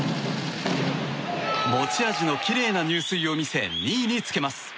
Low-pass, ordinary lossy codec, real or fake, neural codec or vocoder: none; none; real; none